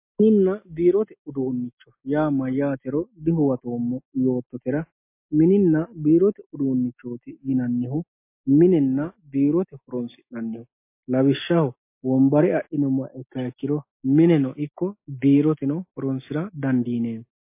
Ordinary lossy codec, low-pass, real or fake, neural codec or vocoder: MP3, 24 kbps; 3.6 kHz; real; none